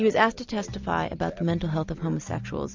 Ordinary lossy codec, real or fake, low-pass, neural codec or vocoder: MP3, 64 kbps; real; 7.2 kHz; none